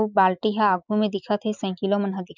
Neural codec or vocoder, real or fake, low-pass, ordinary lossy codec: none; real; 7.2 kHz; none